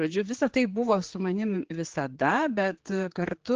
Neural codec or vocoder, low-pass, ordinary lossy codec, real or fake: codec, 16 kHz, 4 kbps, X-Codec, HuBERT features, trained on general audio; 7.2 kHz; Opus, 16 kbps; fake